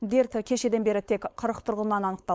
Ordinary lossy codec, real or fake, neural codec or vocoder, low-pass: none; fake; codec, 16 kHz, 4.8 kbps, FACodec; none